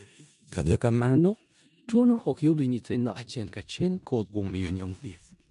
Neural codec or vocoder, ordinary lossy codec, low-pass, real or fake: codec, 16 kHz in and 24 kHz out, 0.4 kbps, LongCat-Audio-Codec, four codebook decoder; none; 10.8 kHz; fake